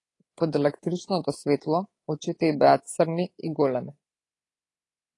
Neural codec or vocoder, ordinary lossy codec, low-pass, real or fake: codec, 24 kHz, 3.1 kbps, DualCodec; AAC, 32 kbps; 10.8 kHz; fake